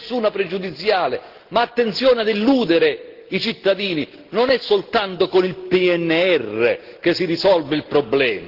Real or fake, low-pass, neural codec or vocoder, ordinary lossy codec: real; 5.4 kHz; none; Opus, 16 kbps